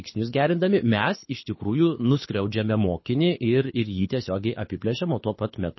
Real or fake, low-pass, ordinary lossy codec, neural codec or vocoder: fake; 7.2 kHz; MP3, 24 kbps; codec, 24 kHz, 6 kbps, HILCodec